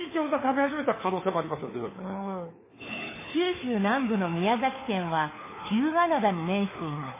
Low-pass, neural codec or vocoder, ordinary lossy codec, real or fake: 3.6 kHz; codec, 16 kHz, 4 kbps, FunCodec, trained on LibriTTS, 50 frames a second; MP3, 16 kbps; fake